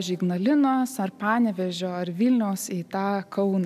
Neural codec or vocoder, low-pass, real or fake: none; 14.4 kHz; real